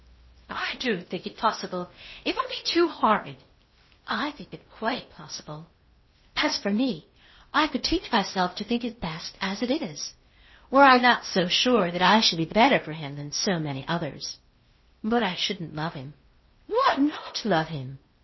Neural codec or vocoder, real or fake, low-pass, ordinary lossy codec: codec, 16 kHz in and 24 kHz out, 0.8 kbps, FocalCodec, streaming, 65536 codes; fake; 7.2 kHz; MP3, 24 kbps